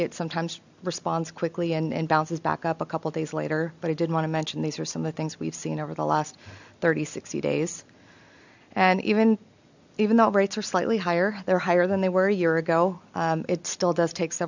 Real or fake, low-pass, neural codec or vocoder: real; 7.2 kHz; none